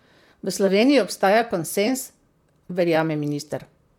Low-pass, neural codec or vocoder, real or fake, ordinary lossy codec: 19.8 kHz; vocoder, 44.1 kHz, 128 mel bands, Pupu-Vocoder; fake; MP3, 96 kbps